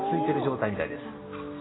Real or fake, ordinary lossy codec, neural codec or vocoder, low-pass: real; AAC, 16 kbps; none; 7.2 kHz